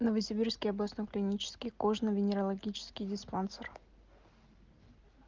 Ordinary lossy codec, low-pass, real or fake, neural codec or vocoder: Opus, 32 kbps; 7.2 kHz; real; none